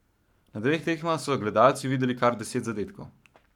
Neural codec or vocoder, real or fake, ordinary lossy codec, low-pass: codec, 44.1 kHz, 7.8 kbps, Pupu-Codec; fake; none; 19.8 kHz